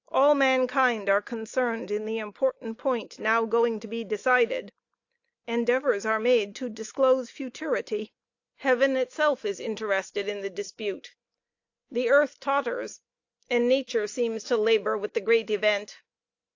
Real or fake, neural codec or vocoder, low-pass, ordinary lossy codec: real; none; 7.2 kHz; AAC, 48 kbps